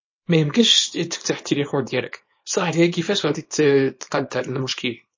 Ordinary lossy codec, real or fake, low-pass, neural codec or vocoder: MP3, 32 kbps; fake; 7.2 kHz; codec, 24 kHz, 0.9 kbps, WavTokenizer, small release